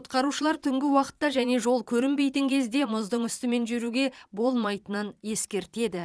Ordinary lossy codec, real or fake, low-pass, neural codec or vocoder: none; fake; none; vocoder, 22.05 kHz, 80 mel bands, Vocos